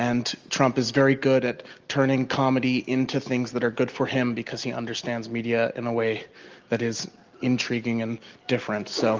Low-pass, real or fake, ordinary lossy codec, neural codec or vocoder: 7.2 kHz; real; Opus, 32 kbps; none